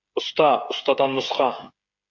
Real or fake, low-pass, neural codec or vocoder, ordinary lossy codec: fake; 7.2 kHz; codec, 16 kHz, 16 kbps, FreqCodec, smaller model; AAC, 48 kbps